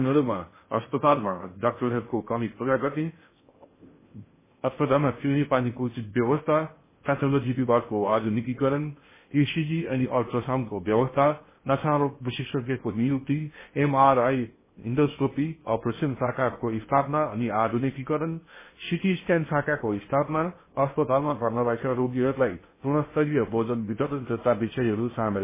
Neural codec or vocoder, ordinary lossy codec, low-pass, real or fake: codec, 16 kHz in and 24 kHz out, 0.6 kbps, FocalCodec, streaming, 4096 codes; MP3, 16 kbps; 3.6 kHz; fake